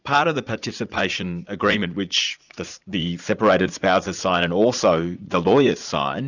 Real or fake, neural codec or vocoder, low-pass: fake; vocoder, 44.1 kHz, 80 mel bands, Vocos; 7.2 kHz